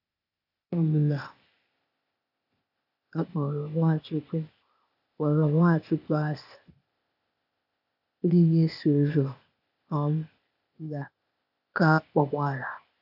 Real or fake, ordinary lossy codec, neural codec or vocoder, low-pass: fake; AAC, 48 kbps; codec, 16 kHz, 0.8 kbps, ZipCodec; 5.4 kHz